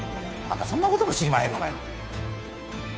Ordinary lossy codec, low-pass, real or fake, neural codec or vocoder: none; none; fake; codec, 16 kHz, 2 kbps, FunCodec, trained on Chinese and English, 25 frames a second